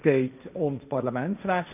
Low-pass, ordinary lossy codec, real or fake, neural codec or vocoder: 3.6 kHz; none; fake; codec, 16 kHz, 1.1 kbps, Voila-Tokenizer